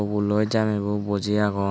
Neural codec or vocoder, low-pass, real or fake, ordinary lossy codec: none; none; real; none